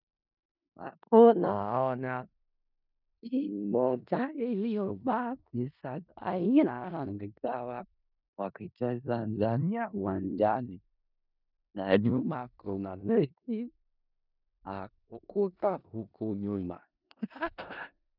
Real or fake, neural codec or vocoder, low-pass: fake; codec, 16 kHz in and 24 kHz out, 0.4 kbps, LongCat-Audio-Codec, four codebook decoder; 5.4 kHz